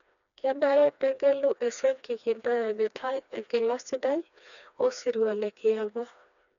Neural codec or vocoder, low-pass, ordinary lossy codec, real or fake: codec, 16 kHz, 2 kbps, FreqCodec, smaller model; 7.2 kHz; none; fake